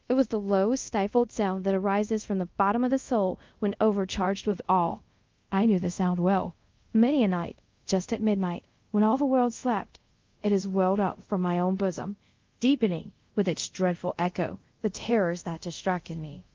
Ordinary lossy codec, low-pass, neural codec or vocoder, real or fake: Opus, 32 kbps; 7.2 kHz; codec, 24 kHz, 0.5 kbps, DualCodec; fake